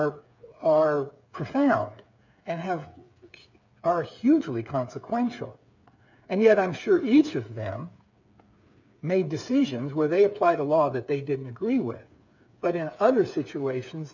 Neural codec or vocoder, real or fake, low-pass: codec, 16 kHz, 8 kbps, FreqCodec, smaller model; fake; 7.2 kHz